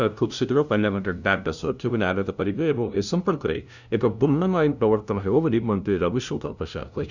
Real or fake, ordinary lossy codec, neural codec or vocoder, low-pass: fake; none; codec, 16 kHz, 0.5 kbps, FunCodec, trained on LibriTTS, 25 frames a second; 7.2 kHz